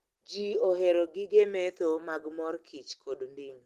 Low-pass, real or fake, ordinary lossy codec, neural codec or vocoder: 14.4 kHz; real; Opus, 16 kbps; none